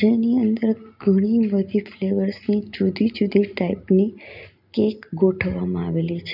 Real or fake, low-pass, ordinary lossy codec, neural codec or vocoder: real; 5.4 kHz; none; none